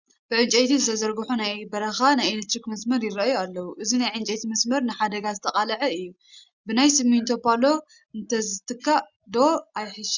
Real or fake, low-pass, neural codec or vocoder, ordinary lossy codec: real; 7.2 kHz; none; Opus, 64 kbps